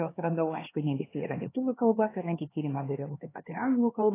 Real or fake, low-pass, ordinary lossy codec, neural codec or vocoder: fake; 3.6 kHz; AAC, 16 kbps; codec, 16 kHz, 2 kbps, X-Codec, HuBERT features, trained on LibriSpeech